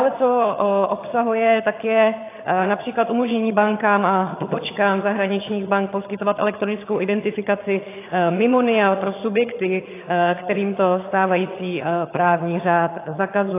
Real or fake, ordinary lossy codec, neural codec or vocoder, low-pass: fake; AAC, 24 kbps; vocoder, 22.05 kHz, 80 mel bands, HiFi-GAN; 3.6 kHz